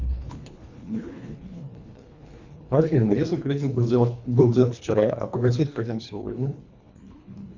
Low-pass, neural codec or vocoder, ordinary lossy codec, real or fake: 7.2 kHz; codec, 24 kHz, 1.5 kbps, HILCodec; Opus, 64 kbps; fake